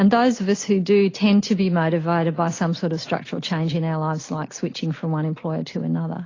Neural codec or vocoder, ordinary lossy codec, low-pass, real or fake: none; AAC, 32 kbps; 7.2 kHz; real